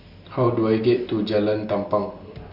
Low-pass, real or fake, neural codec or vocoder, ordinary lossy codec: 5.4 kHz; real; none; none